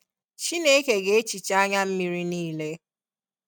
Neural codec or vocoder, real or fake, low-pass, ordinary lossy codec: none; real; none; none